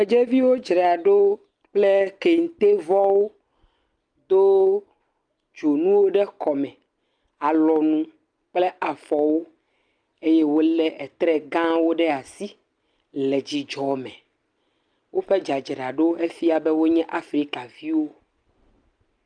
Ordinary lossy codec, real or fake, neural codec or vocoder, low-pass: Opus, 32 kbps; real; none; 9.9 kHz